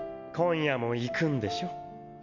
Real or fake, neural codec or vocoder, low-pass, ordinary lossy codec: real; none; 7.2 kHz; none